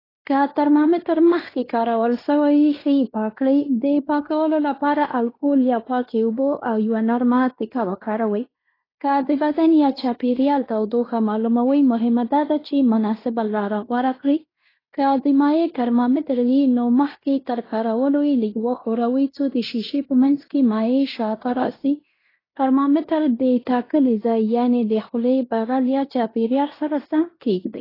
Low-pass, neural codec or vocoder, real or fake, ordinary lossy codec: 5.4 kHz; codec, 16 kHz in and 24 kHz out, 0.9 kbps, LongCat-Audio-Codec, fine tuned four codebook decoder; fake; AAC, 24 kbps